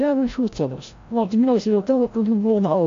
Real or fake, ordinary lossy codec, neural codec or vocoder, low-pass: fake; AAC, 48 kbps; codec, 16 kHz, 0.5 kbps, FreqCodec, larger model; 7.2 kHz